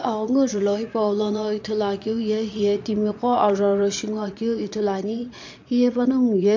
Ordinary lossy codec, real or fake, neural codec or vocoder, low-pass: MP3, 48 kbps; fake; vocoder, 22.05 kHz, 80 mel bands, Vocos; 7.2 kHz